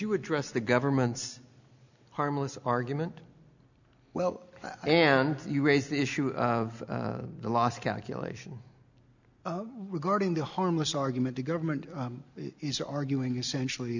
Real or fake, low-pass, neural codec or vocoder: real; 7.2 kHz; none